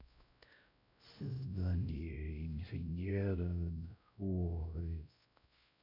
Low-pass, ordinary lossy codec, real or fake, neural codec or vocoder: 5.4 kHz; none; fake; codec, 16 kHz, 0.5 kbps, X-Codec, WavLM features, trained on Multilingual LibriSpeech